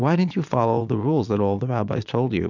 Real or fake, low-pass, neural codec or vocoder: fake; 7.2 kHz; vocoder, 22.05 kHz, 80 mel bands, Vocos